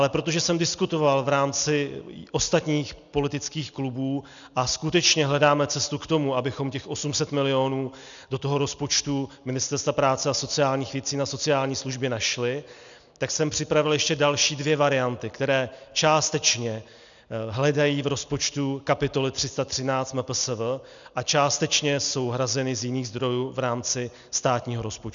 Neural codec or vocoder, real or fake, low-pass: none; real; 7.2 kHz